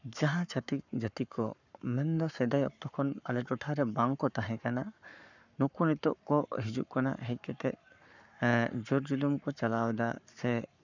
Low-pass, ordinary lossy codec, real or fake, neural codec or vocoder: 7.2 kHz; none; fake; codec, 44.1 kHz, 7.8 kbps, Pupu-Codec